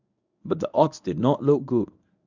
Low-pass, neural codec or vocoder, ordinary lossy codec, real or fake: 7.2 kHz; codec, 24 kHz, 0.9 kbps, WavTokenizer, medium speech release version 1; none; fake